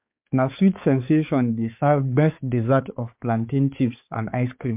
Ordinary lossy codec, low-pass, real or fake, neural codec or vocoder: MP3, 32 kbps; 3.6 kHz; fake; codec, 16 kHz, 4 kbps, X-Codec, HuBERT features, trained on balanced general audio